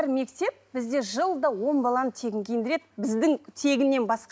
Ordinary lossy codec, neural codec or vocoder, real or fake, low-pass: none; none; real; none